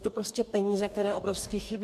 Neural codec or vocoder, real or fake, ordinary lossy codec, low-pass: codec, 44.1 kHz, 2.6 kbps, DAC; fake; MP3, 96 kbps; 14.4 kHz